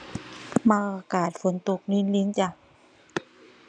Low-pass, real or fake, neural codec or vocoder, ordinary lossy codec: 9.9 kHz; real; none; none